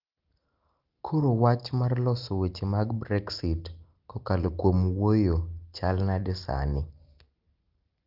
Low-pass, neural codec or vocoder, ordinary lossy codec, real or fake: 5.4 kHz; none; Opus, 24 kbps; real